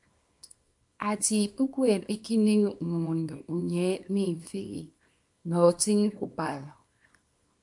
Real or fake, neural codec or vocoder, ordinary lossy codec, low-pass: fake; codec, 24 kHz, 0.9 kbps, WavTokenizer, small release; MP3, 64 kbps; 10.8 kHz